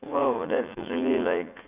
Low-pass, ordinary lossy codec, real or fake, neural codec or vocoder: 3.6 kHz; Opus, 64 kbps; fake; vocoder, 44.1 kHz, 80 mel bands, Vocos